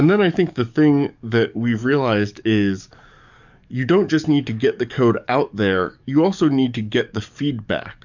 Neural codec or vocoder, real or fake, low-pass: codec, 44.1 kHz, 7.8 kbps, DAC; fake; 7.2 kHz